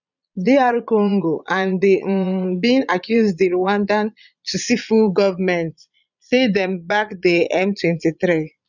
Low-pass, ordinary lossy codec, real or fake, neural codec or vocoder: 7.2 kHz; none; fake; vocoder, 22.05 kHz, 80 mel bands, Vocos